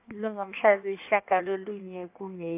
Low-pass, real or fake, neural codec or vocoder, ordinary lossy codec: 3.6 kHz; fake; codec, 16 kHz in and 24 kHz out, 1.1 kbps, FireRedTTS-2 codec; AAC, 32 kbps